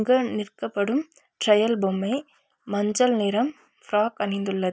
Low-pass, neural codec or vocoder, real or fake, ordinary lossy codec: none; none; real; none